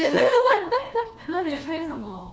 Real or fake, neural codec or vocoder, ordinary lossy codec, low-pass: fake; codec, 16 kHz, 1 kbps, FunCodec, trained on LibriTTS, 50 frames a second; none; none